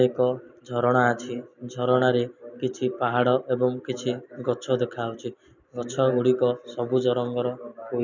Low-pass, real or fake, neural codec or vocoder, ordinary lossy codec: 7.2 kHz; real; none; none